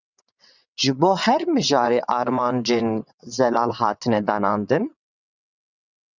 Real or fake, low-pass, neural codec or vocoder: fake; 7.2 kHz; vocoder, 22.05 kHz, 80 mel bands, WaveNeXt